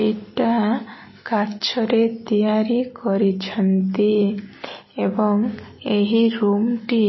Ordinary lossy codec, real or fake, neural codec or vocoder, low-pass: MP3, 24 kbps; real; none; 7.2 kHz